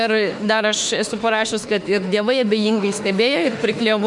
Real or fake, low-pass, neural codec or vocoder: fake; 10.8 kHz; autoencoder, 48 kHz, 32 numbers a frame, DAC-VAE, trained on Japanese speech